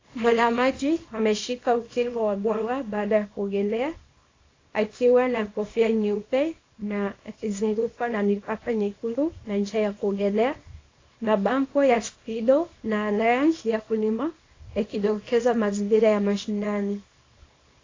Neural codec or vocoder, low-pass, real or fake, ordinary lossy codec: codec, 24 kHz, 0.9 kbps, WavTokenizer, small release; 7.2 kHz; fake; AAC, 32 kbps